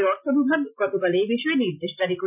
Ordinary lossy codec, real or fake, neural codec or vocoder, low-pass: none; real; none; 3.6 kHz